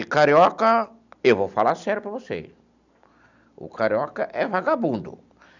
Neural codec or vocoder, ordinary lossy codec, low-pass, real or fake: none; none; 7.2 kHz; real